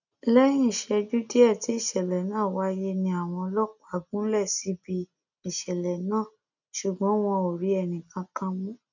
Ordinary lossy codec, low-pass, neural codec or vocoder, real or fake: none; 7.2 kHz; none; real